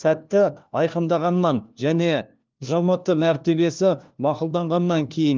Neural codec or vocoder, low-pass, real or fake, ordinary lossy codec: codec, 16 kHz, 1 kbps, FunCodec, trained on LibriTTS, 50 frames a second; 7.2 kHz; fake; Opus, 24 kbps